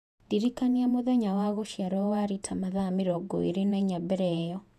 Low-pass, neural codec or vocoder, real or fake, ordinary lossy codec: 14.4 kHz; vocoder, 48 kHz, 128 mel bands, Vocos; fake; none